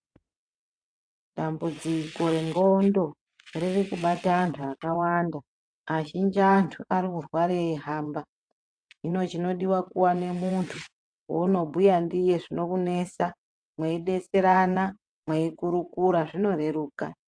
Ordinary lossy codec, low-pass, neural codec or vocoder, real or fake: AAC, 64 kbps; 9.9 kHz; vocoder, 22.05 kHz, 80 mel bands, WaveNeXt; fake